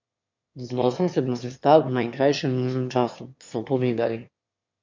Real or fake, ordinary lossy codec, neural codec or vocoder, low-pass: fake; MP3, 48 kbps; autoencoder, 22.05 kHz, a latent of 192 numbers a frame, VITS, trained on one speaker; 7.2 kHz